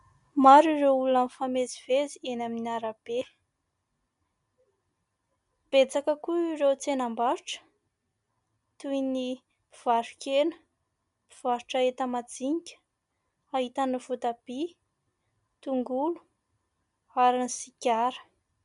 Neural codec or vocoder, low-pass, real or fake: none; 10.8 kHz; real